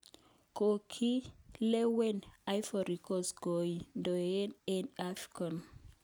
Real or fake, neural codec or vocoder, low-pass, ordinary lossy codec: real; none; none; none